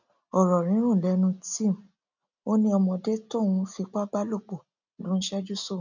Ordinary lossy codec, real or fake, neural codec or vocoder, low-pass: none; real; none; 7.2 kHz